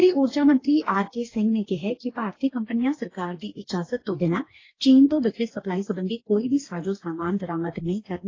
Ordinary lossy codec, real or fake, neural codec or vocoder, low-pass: AAC, 32 kbps; fake; codec, 44.1 kHz, 2.6 kbps, DAC; 7.2 kHz